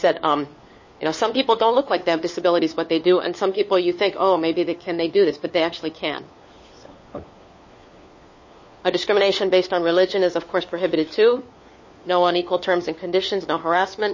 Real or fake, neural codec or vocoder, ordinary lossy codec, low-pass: fake; codec, 16 kHz, 4 kbps, FunCodec, trained on LibriTTS, 50 frames a second; MP3, 32 kbps; 7.2 kHz